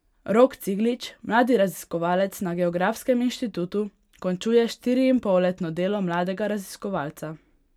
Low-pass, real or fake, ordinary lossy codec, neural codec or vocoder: 19.8 kHz; real; none; none